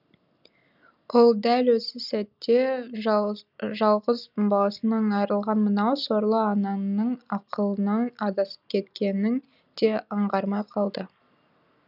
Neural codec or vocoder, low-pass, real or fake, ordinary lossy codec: none; 5.4 kHz; real; none